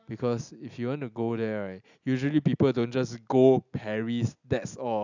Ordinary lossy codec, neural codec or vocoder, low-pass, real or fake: none; none; 7.2 kHz; real